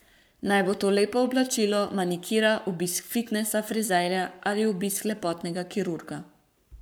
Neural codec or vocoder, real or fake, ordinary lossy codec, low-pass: codec, 44.1 kHz, 7.8 kbps, Pupu-Codec; fake; none; none